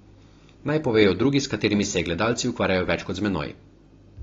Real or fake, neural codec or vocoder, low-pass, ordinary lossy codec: real; none; 7.2 kHz; AAC, 32 kbps